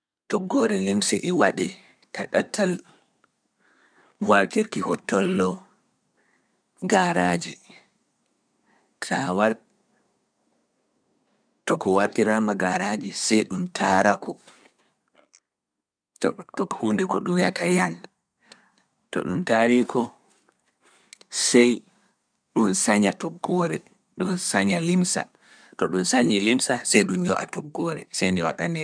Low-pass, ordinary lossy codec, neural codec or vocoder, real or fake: 9.9 kHz; none; codec, 24 kHz, 1 kbps, SNAC; fake